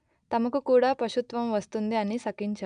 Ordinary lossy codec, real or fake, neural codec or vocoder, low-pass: none; real; none; 9.9 kHz